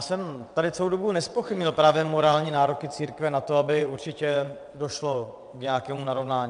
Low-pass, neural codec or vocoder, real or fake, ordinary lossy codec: 9.9 kHz; vocoder, 22.05 kHz, 80 mel bands, WaveNeXt; fake; MP3, 96 kbps